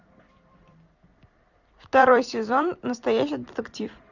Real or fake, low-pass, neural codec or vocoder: fake; 7.2 kHz; vocoder, 44.1 kHz, 128 mel bands every 256 samples, BigVGAN v2